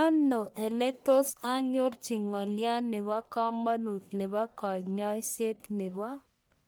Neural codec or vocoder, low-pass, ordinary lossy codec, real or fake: codec, 44.1 kHz, 1.7 kbps, Pupu-Codec; none; none; fake